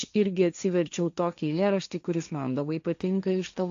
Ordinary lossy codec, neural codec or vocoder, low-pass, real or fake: AAC, 64 kbps; codec, 16 kHz, 1.1 kbps, Voila-Tokenizer; 7.2 kHz; fake